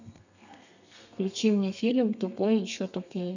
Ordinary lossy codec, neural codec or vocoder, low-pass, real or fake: none; codec, 32 kHz, 1.9 kbps, SNAC; 7.2 kHz; fake